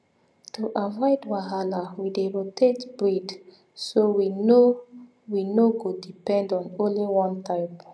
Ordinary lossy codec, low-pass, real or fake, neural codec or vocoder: none; none; real; none